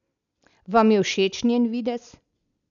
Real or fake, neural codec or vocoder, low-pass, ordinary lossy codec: real; none; 7.2 kHz; none